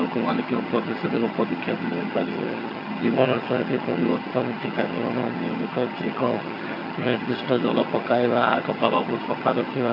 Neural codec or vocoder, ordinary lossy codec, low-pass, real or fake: vocoder, 22.05 kHz, 80 mel bands, HiFi-GAN; AAC, 32 kbps; 5.4 kHz; fake